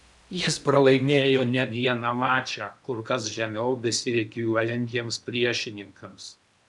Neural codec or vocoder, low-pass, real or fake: codec, 16 kHz in and 24 kHz out, 0.8 kbps, FocalCodec, streaming, 65536 codes; 10.8 kHz; fake